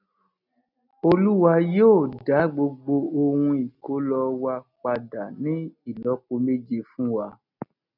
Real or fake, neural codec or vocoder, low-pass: real; none; 5.4 kHz